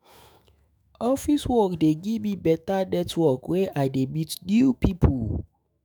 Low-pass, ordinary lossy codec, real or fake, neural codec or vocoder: none; none; fake; autoencoder, 48 kHz, 128 numbers a frame, DAC-VAE, trained on Japanese speech